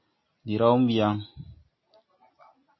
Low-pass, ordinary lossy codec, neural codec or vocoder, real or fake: 7.2 kHz; MP3, 24 kbps; none; real